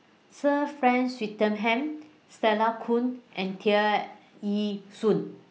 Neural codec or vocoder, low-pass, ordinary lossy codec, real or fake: none; none; none; real